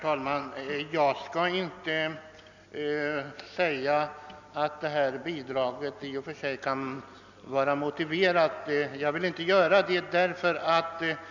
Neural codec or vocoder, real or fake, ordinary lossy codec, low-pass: none; real; none; 7.2 kHz